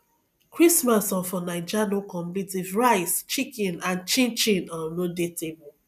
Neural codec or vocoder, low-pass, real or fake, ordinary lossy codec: none; 14.4 kHz; real; none